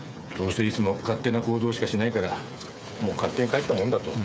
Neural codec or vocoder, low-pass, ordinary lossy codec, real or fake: codec, 16 kHz, 8 kbps, FreqCodec, smaller model; none; none; fake